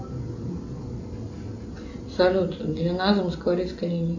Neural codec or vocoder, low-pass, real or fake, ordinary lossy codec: none; 7.2 kHz; real; AAC, 48 kbps